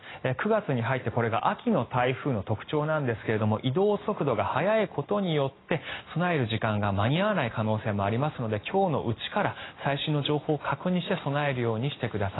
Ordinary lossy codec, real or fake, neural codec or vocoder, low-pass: AAC, 16 kbps; real; none; 7.2 kHz